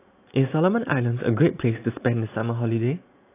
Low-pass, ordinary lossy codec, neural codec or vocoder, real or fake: 3.6 kHz; AAC, 24 kbps; none; real